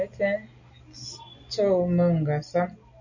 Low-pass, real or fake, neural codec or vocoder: 7.2 kHz; real; none